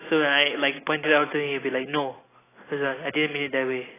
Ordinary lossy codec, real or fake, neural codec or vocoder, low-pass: AAC, 16 kbps; real; none; 3.6 kHz